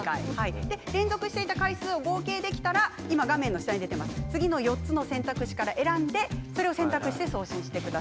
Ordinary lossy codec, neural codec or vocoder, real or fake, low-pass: none; none; real; none